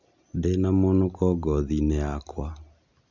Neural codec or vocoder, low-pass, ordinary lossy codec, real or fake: none; 7.2 kHz; none; real